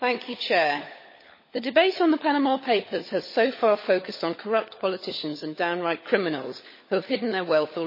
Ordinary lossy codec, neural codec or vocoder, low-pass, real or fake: MP3, 24 kbps; codec, 16 kHz, 16 kbps, FunCodec, trained on LibriTTS, 50 frames a second; 5.4 kHz; fake